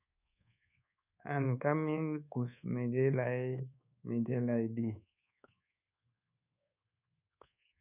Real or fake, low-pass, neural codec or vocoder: fake; 3.6 kHz; codec, 24 kHz, 1.2 kbps, DualCodec